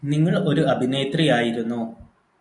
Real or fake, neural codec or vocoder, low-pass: real; none; 10.8 kHz